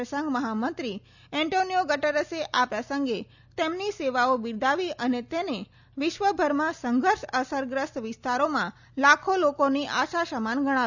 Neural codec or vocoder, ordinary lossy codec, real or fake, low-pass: none; none; real; 7.2 kHz